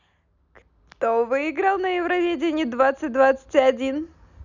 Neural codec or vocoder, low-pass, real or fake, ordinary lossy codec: none; 7.2 kHz; real; none